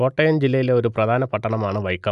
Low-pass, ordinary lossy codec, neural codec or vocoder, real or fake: 10.8 kHz; none; none; real